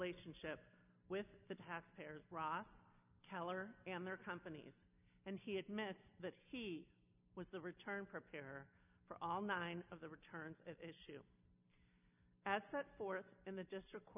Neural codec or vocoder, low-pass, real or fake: none; 3.6 kHz; real